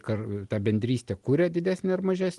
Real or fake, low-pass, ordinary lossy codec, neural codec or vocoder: real; 10.8 kHz; Opus, 24 kbps; none